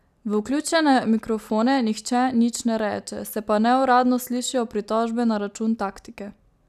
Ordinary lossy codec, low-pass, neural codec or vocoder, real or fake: none; 14.4 kHz; none; real